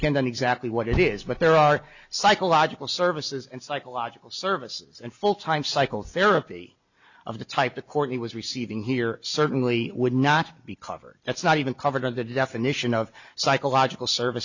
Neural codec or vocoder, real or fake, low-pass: none; real; 7.2 kHz